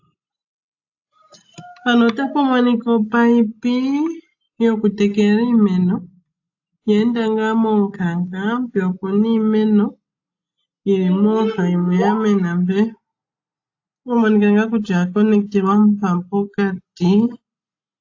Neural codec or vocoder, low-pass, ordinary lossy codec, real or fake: none; 7.2 kHz; AAC, 48 kbps; real